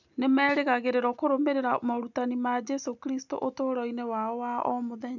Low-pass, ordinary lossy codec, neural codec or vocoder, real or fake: 7.2 kHz; none; none; real